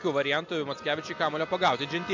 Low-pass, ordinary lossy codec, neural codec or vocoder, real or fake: 7.2 kHz; MP3, 64 kbps; none; real